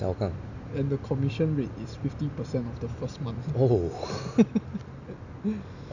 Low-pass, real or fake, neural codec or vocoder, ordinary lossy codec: 7.2 kHz; real; none; none